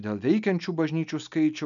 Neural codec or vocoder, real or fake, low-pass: none; real; 7.2 kHz